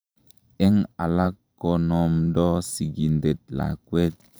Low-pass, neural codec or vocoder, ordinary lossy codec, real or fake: none; vocoder, 44.1 kHz, 128 mel bands every 512 samples, BigVGAN v2; none; fake